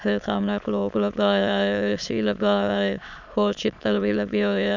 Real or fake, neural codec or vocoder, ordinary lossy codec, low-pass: fake; autoencoder, 22.05 kHz, a latent of 192 numbers a frame, VITS, trained on many speakers; none; 7.2 kHz